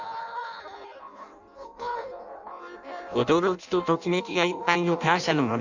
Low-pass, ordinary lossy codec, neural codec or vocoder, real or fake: 7.2 kHz; none; codec, 16 kHz in and 24 kHz out, 0.6 kbps, FireRedTTS-2 codec; fake